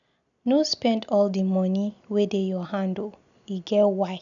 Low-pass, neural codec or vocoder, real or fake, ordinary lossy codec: 7.2 kHz; none; real; none